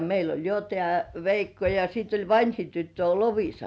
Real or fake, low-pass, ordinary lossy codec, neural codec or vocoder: real; none; none; none